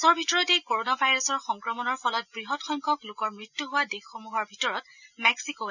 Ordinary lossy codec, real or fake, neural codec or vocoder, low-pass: none; real; none; 7.2 kHz